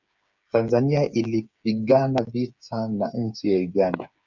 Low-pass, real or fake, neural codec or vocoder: 7.2 kHz; fake; codec, 16 kHz, 8 kbps, FreqCodec, smaller model